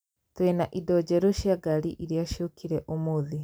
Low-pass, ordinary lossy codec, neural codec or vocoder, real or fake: none; none; none; real